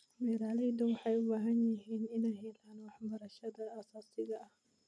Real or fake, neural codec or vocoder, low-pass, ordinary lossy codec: real; none; none; none